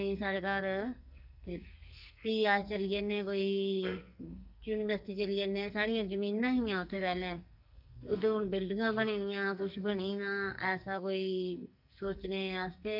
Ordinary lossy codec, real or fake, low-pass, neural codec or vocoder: none; fake; 5.4 kHz; codec, 44.1 kHz, 2.6 kbps, SNAC